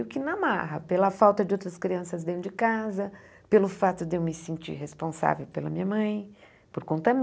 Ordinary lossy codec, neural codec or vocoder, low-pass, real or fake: none; none; none; real